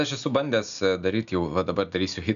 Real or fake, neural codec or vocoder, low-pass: real; none; 7.2 kHz